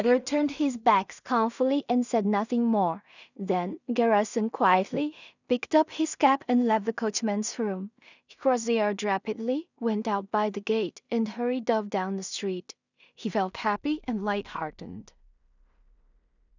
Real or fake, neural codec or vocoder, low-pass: fake; codec, 16 kHz in and 24 kHz out, 0.4 kbps, LongCat-Audio-Codec, two codebook decoder; 7.2 kHz